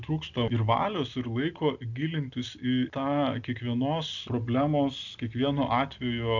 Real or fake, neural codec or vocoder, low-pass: real; none; 7.2 kHz